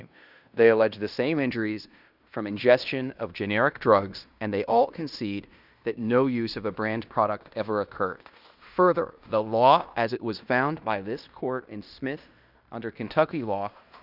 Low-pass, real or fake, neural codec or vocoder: 5.4 kHz; fake; codec, 16 kHz in and 24 kHz out, 0.9 kbps, LongCat-Audio-Codec, fine tuned four codebook decoder